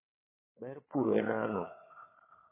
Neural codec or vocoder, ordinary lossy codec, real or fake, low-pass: codec, 16 kHz, 4 kbps, FunCodec, trained on Chinese and English, 50 frames a second; MP3, 16 kbps; fake; 3.6 kHz